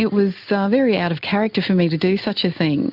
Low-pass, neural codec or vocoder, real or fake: 5.4 kHz; none; real